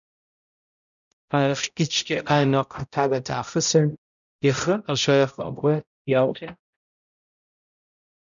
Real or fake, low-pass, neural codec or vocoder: fake; 7.2 kHz; codec, 16 kHz, 0.5 kbps, X-Codec, HuBERT features, trained on balanced general audio